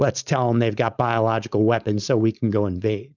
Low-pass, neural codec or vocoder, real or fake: 7.2 kHz; codec, 16 kHz, 4.8 kbps, FACodec; fake